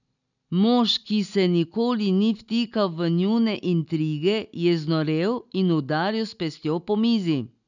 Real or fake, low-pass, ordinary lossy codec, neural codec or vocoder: real; 7.2 kHz; none; none